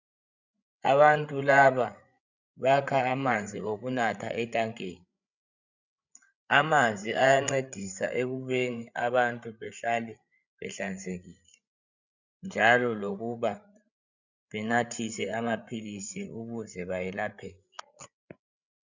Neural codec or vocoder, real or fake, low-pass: codec, 16 kHz, 8 kbps, FreqCodec, larger model; fake; 7.2 kHz